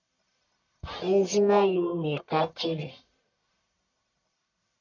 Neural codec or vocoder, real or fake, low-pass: codec, 44.1 kHz, 1.7 kbps, Pupu-Codec; fake; 7.2 kHz